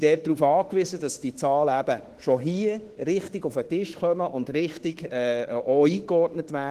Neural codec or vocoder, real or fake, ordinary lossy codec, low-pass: autoencoder, 48 kHz, 32 numbers a frame, DAC-VAE, trained on Japanese speech; fake; Opus, 16 kbps; 14.4 kHz